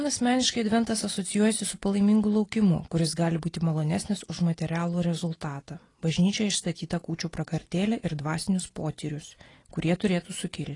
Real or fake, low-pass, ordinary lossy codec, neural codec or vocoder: real; 10.8 kHz; AAC, 32 kbps; none